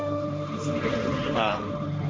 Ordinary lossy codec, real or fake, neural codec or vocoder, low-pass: none; fake; codec, 16 kHz, 1.1 kbps, Voila-Tokenizer; none